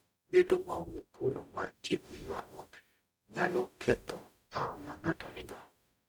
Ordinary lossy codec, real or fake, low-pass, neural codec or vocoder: none; fake; none; codec, 44.1 kHz, 0.9 kbps, DAC